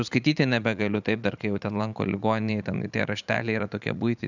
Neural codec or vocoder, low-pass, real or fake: vocoder, 44.1 kHz, 80 mel bands, Vocos; 7.2 kHz; fake